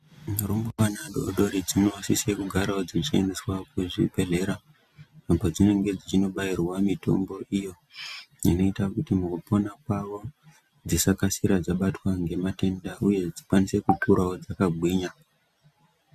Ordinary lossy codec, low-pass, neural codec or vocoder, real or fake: Opus, 64 kbps; 14.4 kHz; none; real